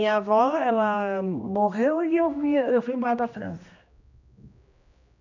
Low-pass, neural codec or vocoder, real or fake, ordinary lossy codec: 7.2 kHz; codec, 16 kHz, 1 kbps, X-Codec, HuBERT features, trained on general audio; fake; none